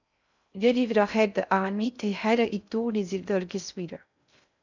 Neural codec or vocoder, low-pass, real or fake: codec, 16 kHz in and 24 kHz out, 0.6 kbps, FocalCodec, streaming, 2048 codes; 7.2 kHz; fake